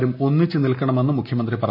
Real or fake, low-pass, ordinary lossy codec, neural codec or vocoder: real; 5.4 kHz; none; none